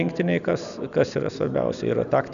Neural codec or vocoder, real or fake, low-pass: none; real; 7.2 kHz